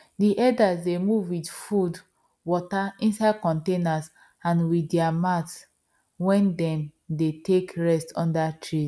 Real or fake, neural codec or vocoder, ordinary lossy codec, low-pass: real; none; none; none